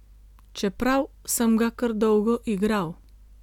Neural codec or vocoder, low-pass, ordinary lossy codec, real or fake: none; 19.8 kHz; none; real